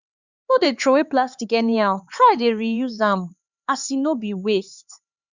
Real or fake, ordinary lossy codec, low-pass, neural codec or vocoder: fake; Opus, 64 kbps; 7.2 kHz; codec, 16 kHz, 4 kbps, X-Codec, HuBERT features, trained on LibriSpeech